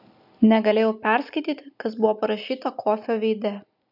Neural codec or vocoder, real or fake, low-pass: none; real; 5.4 kHz